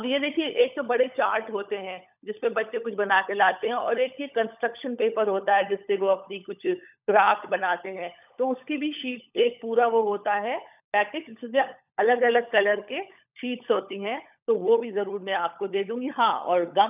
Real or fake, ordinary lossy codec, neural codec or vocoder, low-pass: fake; none; codec, 16 kHz, 16 kbps, FunCodec, trained on LibriTTS, 50 frames a second; 3.6 kHz